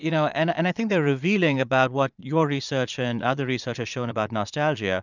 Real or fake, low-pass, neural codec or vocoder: fake; 7.2 kHz; vocoder, 22.05 kHz, 80 mel bands, Vocos